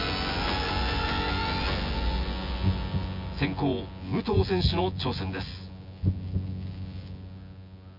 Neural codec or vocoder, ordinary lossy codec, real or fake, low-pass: vocoder, 24 kHz, 100 mel bands, Vocos; none; fake; 5.4 kHz